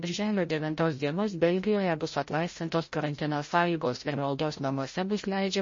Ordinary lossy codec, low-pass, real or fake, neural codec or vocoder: MP3, 32 kbps; 7.2 kHz; fake; codec, 16 kHz, 0.5 kbps, FreqCodec, larger model